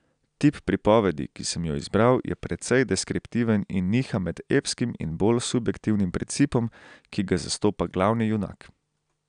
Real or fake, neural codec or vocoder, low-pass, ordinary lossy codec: real; none; 9.9 kHz; none